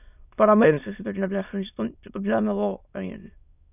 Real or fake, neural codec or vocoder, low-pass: fake; autoencoder, 22.05 kHz, a latent of 192 numbers a frame, VITS, trained on many speakers; 3.6 kHz